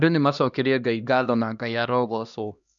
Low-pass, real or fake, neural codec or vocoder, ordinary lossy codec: 7.2 kHz; fake; codec, 16 kHz, 1 kbps, X-Codec, HuBERT features, trained on LibriSpeech; none